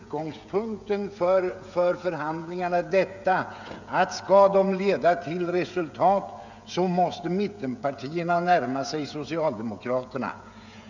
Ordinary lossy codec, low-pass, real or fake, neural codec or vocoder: none; 7.2 kHz; fake; codec, 16 kHz, 16 kbps, FreqCodec, smaller model